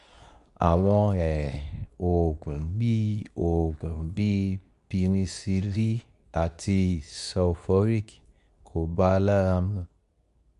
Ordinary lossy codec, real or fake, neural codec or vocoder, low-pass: none; fake; codec, 24 kHz, 0.9 kbps, WavTokenizer, medium speech release version 2; 10.8 kHz